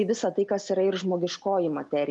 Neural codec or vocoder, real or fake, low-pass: none; real; 10.8 kHz